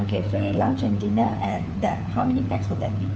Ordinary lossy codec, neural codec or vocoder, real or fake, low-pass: none; codec, 16 kHz, 4 kbps, FunCodec, trained on LibriTTS, 50 frames a second; fake; none